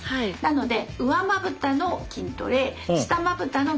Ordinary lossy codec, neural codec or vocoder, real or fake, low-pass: none; none; real; none